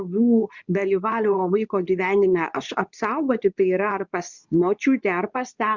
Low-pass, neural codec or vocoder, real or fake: 7.2 kHz; codec, 24 kHz, 0.9 kbps, WavTokenizer, medium speech release version 2; fake